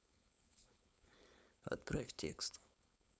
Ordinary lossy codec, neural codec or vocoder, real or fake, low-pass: none; codec, 16 kHz, 4.8 kbps, FACodec; fake; none